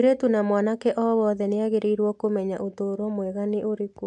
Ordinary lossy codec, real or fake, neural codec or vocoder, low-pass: AAC, 64 kbps; real; none; 10.8 kHz